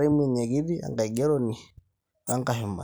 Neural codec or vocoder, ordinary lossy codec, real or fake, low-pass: none; none; real; none